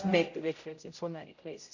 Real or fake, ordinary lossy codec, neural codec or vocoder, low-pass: fake; none; codec, 16 kHz, 0.5 kbps, X-Codec, HuBERT features, trained on general audio; 7.2 kHz